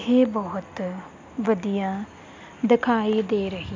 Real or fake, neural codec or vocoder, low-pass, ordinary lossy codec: real; none; 7.2 kHz; MP3, 64 kbps